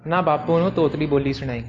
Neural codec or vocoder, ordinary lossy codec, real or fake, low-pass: none; Opus, 24 kbps; real; 7.2 kHz